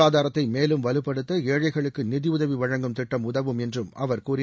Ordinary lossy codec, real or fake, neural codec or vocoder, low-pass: none; real; none; none